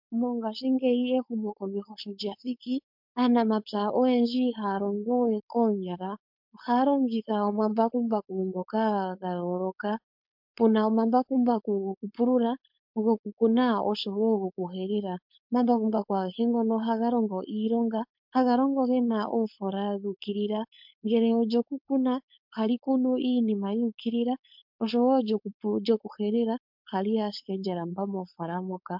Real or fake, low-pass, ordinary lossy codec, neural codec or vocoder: fake; 5.4 kHz; MP3, 48 kbps; codec, 16 kHz, 4.8 kbps, FACodec